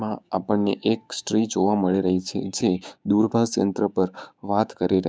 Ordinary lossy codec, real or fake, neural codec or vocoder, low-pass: none; fake; codec, 16 kHz, 6 kbps, DAC; none